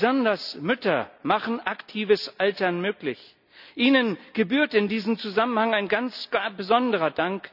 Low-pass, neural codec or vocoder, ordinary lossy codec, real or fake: 5.4 kHz; none; none; real